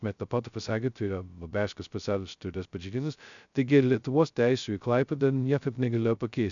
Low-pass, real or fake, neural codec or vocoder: 7.2 kHz; fake; codec, 16 kHz, 0.2 kbps, FocalCodec